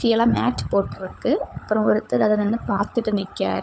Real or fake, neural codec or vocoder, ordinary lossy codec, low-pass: fake; codec, 16 kHz, 16 kbps, FunCodec, trained on LibriTTS, 50 frames a second; none; none